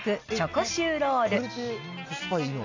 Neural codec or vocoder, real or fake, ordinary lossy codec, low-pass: none; real; AAC, 48 kbps; 7.2 kHz